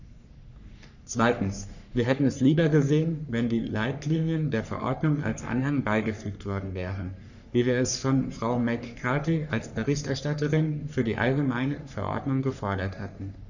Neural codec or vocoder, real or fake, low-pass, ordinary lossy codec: codec, 44.1 kHz, 3.4 kbps, Pupu-Codec; fake; 7.2 kHz; none